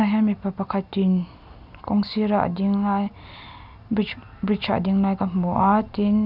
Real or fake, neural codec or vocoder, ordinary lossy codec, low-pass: real; none; none; 5.4 kHz